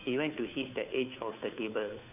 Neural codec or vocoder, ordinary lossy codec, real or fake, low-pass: codec, 16 kHz, 2 kbps, FunCodec, trained on Chinese and English, 25 frames a second; none; fake; 3.6 kHz